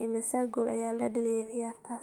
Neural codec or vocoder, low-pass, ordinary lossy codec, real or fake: autoencoder, 48 kHz, 32 numbers a frame, DAC-VAE, trained on Japanese speech; 19.8 kHz; Opus, 32 kbps; fake